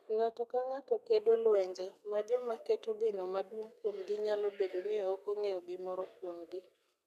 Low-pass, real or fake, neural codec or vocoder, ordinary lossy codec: 14.4 kHz; fake; codec, 32 kHz, 1.9 kbps, SNAC; none